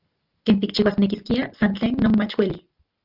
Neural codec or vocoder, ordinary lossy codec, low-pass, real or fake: none; Opus, 24 kbps; 5.4 kHz; real